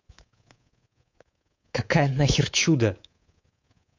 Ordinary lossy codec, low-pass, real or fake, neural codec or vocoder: AAC, 48 kbps; 7.2 kHz; fake; codec, 24 kHz, 3.1 kbps, DualCodec